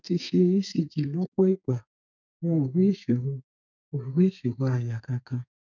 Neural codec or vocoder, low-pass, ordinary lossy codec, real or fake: codec, 32 kHz, 1.9 kbps, SNAC; 7.2 kHz; none; fake